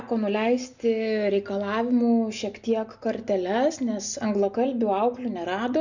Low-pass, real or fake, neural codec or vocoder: 7.2 kHz; real; none